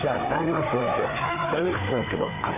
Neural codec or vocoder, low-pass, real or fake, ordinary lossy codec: codec, 16 kHz, 4 kbps, FreqCodec, larger model; 3.6 kHz; fake; none